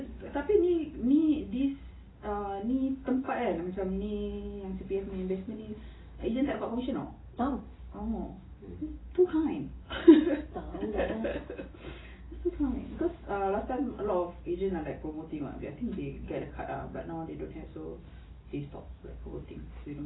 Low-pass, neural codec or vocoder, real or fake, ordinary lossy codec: 7.2 kHz; none; real; AAC, 16 kbps